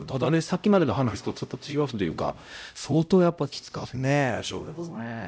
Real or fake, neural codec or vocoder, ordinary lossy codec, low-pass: fake; codec, 16 kHz, 0.5 kbps, X-Codec, HuBERT features, trained on LibriSpeech; none; none